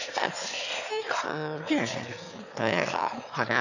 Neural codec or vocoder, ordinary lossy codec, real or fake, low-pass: autoencoder, 22.05 kHz, a latent of 192 numbers a frame, VITS, trained on one speaker; none; fake; 7.2 kHz